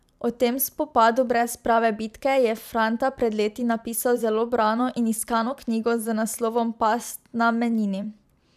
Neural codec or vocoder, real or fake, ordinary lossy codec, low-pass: vocoder, 44.1 kHz, 128 mel bands every 512 samples, BigVGAN v2; fake; none; 14.4 kHz